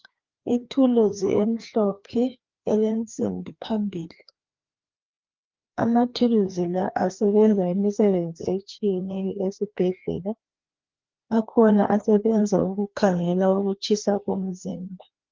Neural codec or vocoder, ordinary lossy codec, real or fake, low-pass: codec, 16 kHz, 2 kbps, FreqCodec, larger model; Opus, 32 kbps; fake; 7.2 kHz